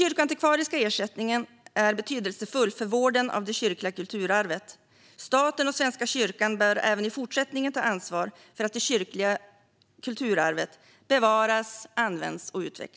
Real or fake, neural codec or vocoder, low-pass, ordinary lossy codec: real; none; none; none